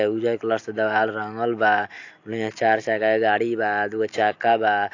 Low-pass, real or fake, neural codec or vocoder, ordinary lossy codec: 7.2 kHz; real; none; AAC, 48 kbps